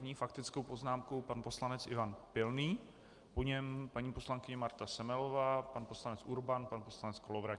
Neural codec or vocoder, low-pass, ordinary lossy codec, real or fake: none; 10.8 kHz; Opus, 32 kbps; real